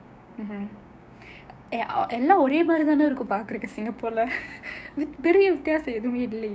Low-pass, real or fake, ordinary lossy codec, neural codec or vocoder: none; fake; none; codec, 16 kHz, 6 kbps, DAC